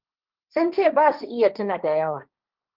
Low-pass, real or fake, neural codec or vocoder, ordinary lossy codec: 5.4 kHz; fake; codec, 16 kHz, 1.1 kbps, Voila-Tokenizer; Opus, 32 kbps